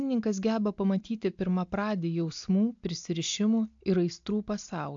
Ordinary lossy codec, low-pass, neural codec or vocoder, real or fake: AAC, 64 kbps; 7.2 kHz; none; real